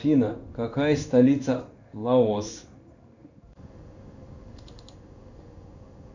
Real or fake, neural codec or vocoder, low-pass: fake; codec, 16 kHz in and 24 kHz out, 1 kbps, XY-Tokenizer; 7.2 kHz